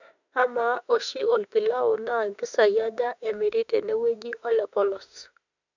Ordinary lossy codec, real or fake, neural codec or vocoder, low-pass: none; fake; autoencoder, 48 kHz, 32 numbers a frame, DAC-VAE, trained on Japanese speech; 7.2 kHz